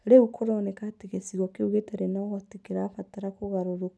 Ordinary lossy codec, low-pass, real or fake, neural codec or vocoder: none; none; real; none